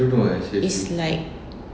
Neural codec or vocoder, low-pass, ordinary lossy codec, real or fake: none; none; none; real